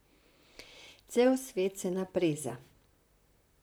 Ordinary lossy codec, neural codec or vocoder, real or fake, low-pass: none; vocoder, 44.1 kHz, 128 mel bands, Pupu-Vocoder; fake; none